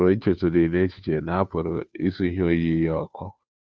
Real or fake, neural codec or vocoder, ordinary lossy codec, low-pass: fake; codec, 16 kHz, 2 kbps, FunCodec, trained on Chinese and English, 25 frames a second; none; none